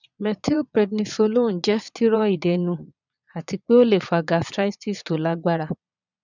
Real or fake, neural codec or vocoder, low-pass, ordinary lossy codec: fake; vocoder, 22.05 kHz, 80 mel bands, Vocos; 7.2 kHz; none